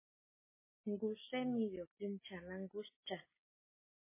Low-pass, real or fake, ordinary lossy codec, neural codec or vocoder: 3.6 kHz; real; MP3, 16 kbps; none